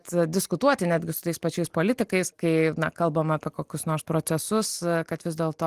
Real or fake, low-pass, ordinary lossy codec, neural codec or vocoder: real; 14.4 kHz; Opus, 24 kbps; none